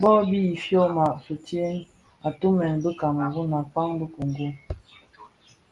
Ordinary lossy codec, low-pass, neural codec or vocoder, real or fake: Opus, 32 kbps; 10.8 kHz; none; real